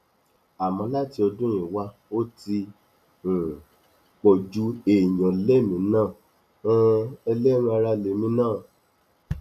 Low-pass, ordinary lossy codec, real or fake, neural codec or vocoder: 14.4 kHz; none; real; none